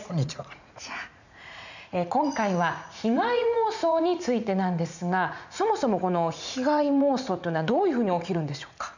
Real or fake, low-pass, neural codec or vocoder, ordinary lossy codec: fake; 7.2 kHz; vocoder, 44.1 kHz, 80 mel bands, Vocos; none